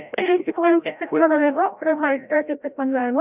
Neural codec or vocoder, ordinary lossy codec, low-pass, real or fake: codec, 16 kHz, 0.5 kbps, FreqCodec, larger model; none; 3.6 kHz; fake